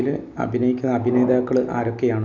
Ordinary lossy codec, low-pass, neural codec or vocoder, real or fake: none; 7.2 kHz; none; real